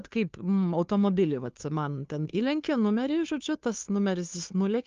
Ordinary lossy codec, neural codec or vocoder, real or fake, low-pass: Opus, 32 kbps; codec, 16 kHz, 2 kbps, FunCodec, trained on Chinese and English, 25 frames a second; fake; 7.2 kHz